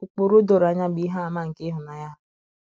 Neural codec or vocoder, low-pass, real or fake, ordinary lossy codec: none; none; real; none